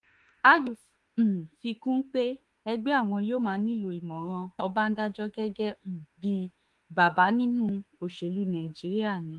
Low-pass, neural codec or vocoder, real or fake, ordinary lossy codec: 10.8 kHz; autoencoder, 48 kHz, 32 numbers a frame, DAC-VAE, trained on Japanese speech; fake; Opus, 24 kbps